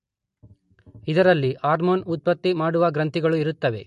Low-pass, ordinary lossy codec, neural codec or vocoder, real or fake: 14.4 kHz; MP3, 48 kbps; none; real